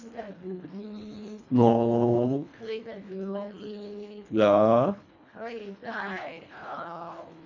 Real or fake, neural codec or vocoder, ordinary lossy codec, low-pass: fake; codec, 24 kHz, 1.5 kbps, HILCodec; none; 7.2 kHz